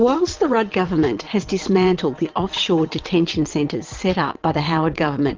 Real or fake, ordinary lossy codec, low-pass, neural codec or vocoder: fake; Opus, 32 kbps; 7.2 kHz; vocoder, 22.05 kHz, 80 mel bands, WaveNeXt